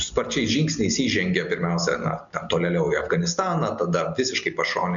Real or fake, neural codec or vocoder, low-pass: real; none; 7.2 kHz